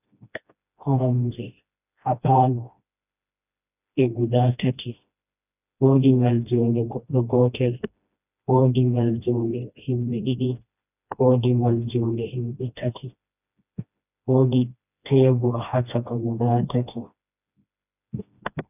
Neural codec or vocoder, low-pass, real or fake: codec, 16 kHz, 1 kbps, FreqCodec, smaller model; 3.6 kHz; fake